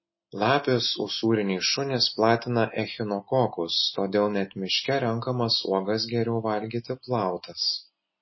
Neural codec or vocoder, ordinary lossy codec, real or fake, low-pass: none; MP3, 24 kbps; real; 7.2 kHz